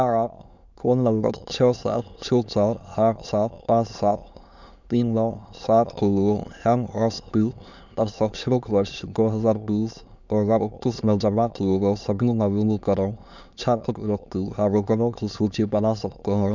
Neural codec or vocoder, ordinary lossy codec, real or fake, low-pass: autoencoder, 22.05 kHz, a latent of 192 numbers a frame, VITS, trained on many speakers; none; fake; 7.2 kHz